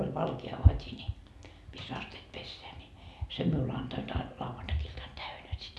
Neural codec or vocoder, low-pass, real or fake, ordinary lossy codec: none; none; real; none